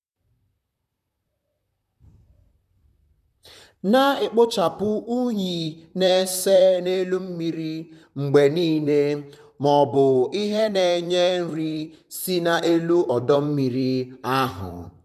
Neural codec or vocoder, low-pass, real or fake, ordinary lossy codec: vocoder, 44.1 kHz, 128 mel bands, Pupu-Vocoder; 14.4 kHz; fake; none